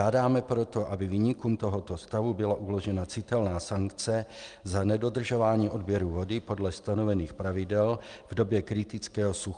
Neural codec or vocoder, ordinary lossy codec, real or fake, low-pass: none; Opus, 32 kbps; real; 9.9 kHz